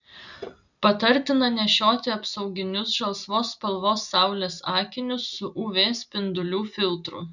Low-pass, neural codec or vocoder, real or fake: 7.2 kHz; none; real